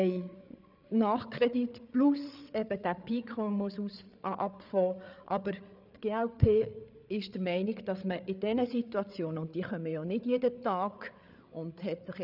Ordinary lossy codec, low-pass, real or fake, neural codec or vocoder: none; 5.4 kHz; fake; codec, 16 kHz, 8 kbps, FreqCodec, larger model